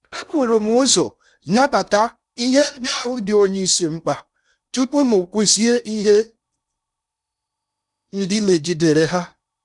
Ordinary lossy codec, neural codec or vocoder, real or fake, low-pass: none; codec, 16 kHz in and 24 kHz out, 0.6 kbps, FocalCodec, streaming, 4096 codes; fake; 10.8 kHz